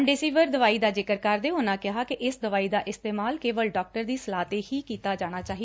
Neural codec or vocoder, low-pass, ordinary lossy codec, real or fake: none; none; none; real